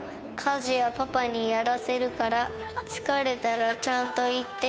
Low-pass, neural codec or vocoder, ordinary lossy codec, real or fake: none; codec, 16 kHz, 2 kbps, FunCodec, trained on Chinese and English, 25 frames a second; none; fake